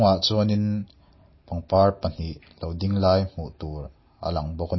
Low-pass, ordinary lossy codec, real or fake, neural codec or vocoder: 7.2 kHz; MP3, 24 kbps; real; none